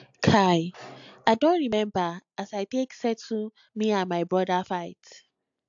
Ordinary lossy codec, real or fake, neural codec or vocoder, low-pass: AAC, 64 kbps; real; none; 7.2 kHz